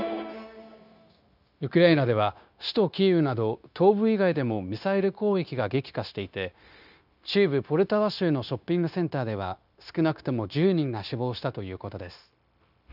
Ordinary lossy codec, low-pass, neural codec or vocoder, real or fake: none; 5.4 kHz; codec, 16 kHz, 0.9 kbps, LongCat-Audio-Codec; fake